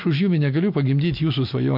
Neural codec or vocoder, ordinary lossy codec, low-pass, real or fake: none; AAC, 48 kbps; 5.4 kHz; real